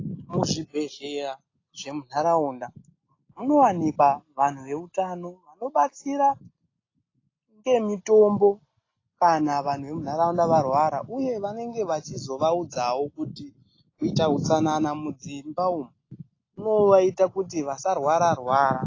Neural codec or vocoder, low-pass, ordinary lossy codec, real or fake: none; 7.2 kHz; AAC, 32 kbps; real